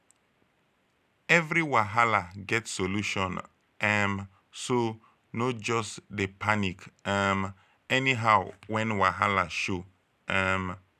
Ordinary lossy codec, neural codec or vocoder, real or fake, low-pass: none; none; real; 14.4 kHz